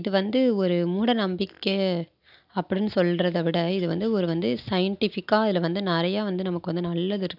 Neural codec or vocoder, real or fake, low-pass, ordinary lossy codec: none; real; 5.4 kHz; none